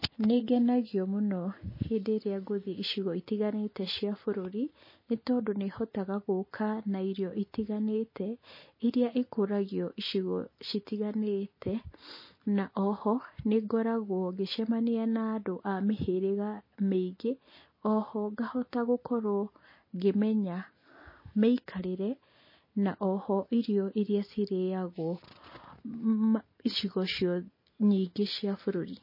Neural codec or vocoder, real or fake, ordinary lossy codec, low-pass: none; real; MP3, 24 kbps; 5.4 kHz